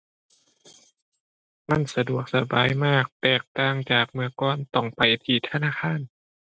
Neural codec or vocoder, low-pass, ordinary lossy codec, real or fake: none; none; none; real